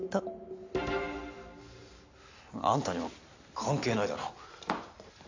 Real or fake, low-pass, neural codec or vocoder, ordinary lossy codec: real; 7.2 kHz; none; AAC, 48 kbps